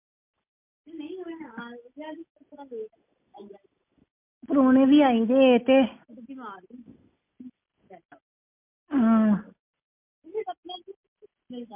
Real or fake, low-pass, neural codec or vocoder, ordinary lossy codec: real; 3.6 kHz; none; MP3, 24 kbps